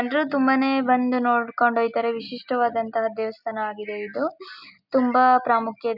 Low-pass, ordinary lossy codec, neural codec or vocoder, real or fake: 5.4 kHz; none; none; real